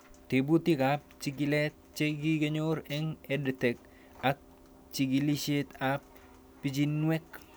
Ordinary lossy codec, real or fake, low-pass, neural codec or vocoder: none; real; none; none